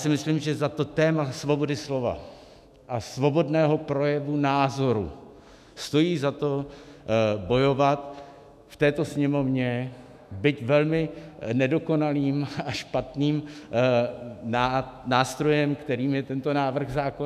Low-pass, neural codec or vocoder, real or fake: 14.4 kHz; autoencoder, 48 kHz, 128 numbers a frame, DAC-VAE, trained on Japanese speech; fake